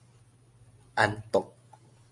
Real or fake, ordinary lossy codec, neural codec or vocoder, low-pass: real; MP3, 64 kbps; none; 10.8 kHz